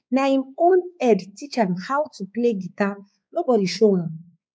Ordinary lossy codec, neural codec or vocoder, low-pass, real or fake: none; codec, 16 kHz, 4 kbps, X-Codec, WavLM features, trained on Multilingual LibriSpeech; none; fake